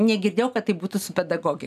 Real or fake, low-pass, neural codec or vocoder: real; 14.4 kHz; none